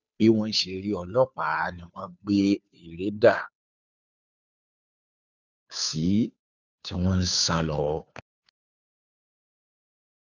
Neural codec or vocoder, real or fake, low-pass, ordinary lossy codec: codec, 16 kHz, 2 kbps, FunCodec, trained on Chinese and English, 25 frames a second; fake; 7.2 kHz; none